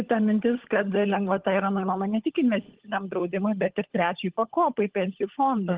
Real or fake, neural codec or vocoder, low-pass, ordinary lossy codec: fake; codec, 16 kHz, 16 kbps, FunCodec, trained on LibriTTS, 50 frames a second; 3.6 kHz; Opus, 16 kbps